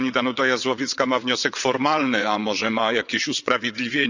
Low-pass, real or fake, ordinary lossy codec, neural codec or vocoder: 7.2 kHz; fake; none; vocoder, 22.05 kHz, 80 mel bands, WaveNeXt